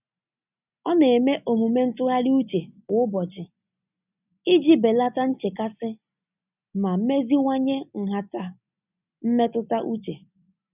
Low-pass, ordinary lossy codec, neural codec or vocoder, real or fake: 3.6 kHz; none; none; real